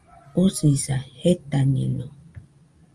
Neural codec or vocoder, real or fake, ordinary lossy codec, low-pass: none; real; Opus, 32 kbps; 10.8 kHz